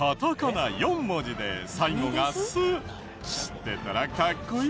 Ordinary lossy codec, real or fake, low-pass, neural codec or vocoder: none; real; none; none